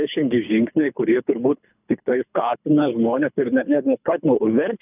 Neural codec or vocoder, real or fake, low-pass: codec, 24 kHz, 3 kbps, HILCodec; fake; 3.6 kHz